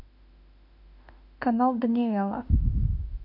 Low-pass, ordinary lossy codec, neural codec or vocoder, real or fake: 5.4 kHz; none; autoencoder, 48 kHz, 32 numbers a frame, DAC-VAE, trained on Japanese speech; fake